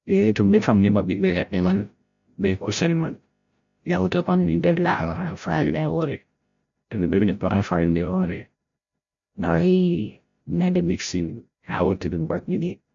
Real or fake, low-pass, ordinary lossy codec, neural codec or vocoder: fake; 7.2 kHz; MP3, 64 kbps; codec, 16 kHz, 0.5 kbps, FreqCodec, larger model